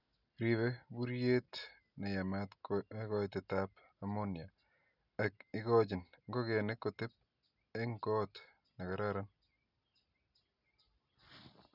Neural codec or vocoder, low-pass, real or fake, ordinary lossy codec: none; 5.4 kHz; real; none